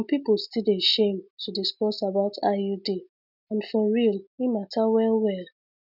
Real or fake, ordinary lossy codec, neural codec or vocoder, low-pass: real; none; none; 5.4 kHz